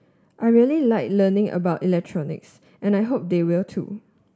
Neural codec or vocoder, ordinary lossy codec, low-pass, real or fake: none; none; none; real